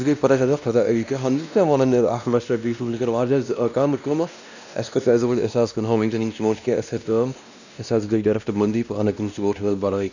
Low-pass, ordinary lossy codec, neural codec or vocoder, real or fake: 7.2 kHz; none; codec, 16 kHz, 1 kbps, X-Codec, WavLM features, trained on Multilingual LibriSpeech; fake